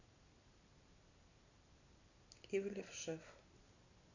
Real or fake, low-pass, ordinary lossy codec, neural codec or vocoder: real; 7.2 kHz; none; none